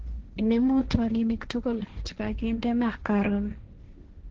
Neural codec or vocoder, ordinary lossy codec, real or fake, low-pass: codec, 16 kHz, 1.1 kbps, Voila-Tokenizer; Opus, 16 kbps; fake; 7.2 kHz